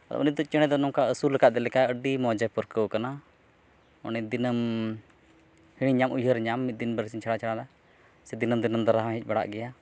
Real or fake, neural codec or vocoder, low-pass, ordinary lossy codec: real; none; none; none